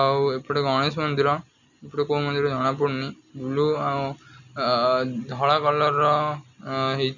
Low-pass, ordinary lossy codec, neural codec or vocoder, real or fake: 7.2 kHz; none; none; real